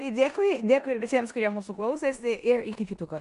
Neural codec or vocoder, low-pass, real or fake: codec, 16 kHz in and 24 kHz out, 0.9 kbps, LongCat-Audio-Codec, four codebook decoder; 10.8 kHz; fake